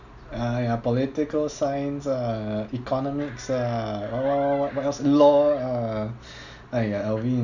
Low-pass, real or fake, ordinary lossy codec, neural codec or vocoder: 7.2 kHz; real; none; none